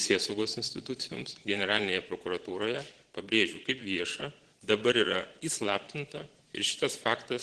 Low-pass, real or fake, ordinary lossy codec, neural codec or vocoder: 9.9 kHz; fake; Opus, 16 kbps; vocoder, 22.05 kHz, 80 mel bands, Vocos